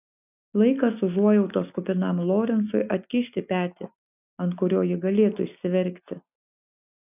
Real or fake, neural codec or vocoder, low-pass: real; none; 3.6 kHz